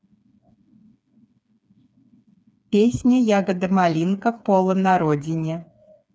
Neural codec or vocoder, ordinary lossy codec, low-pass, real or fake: codec, 16 kHz, 4 kbps, FreqCodec, smaller model; none; none; fake